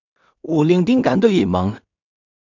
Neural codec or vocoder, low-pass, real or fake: codec, 16 kHz in and 24 kHz out, 0.4 kbps, LongCat-Audio-Codec, two codebook decoder; 7.2 kHz; fake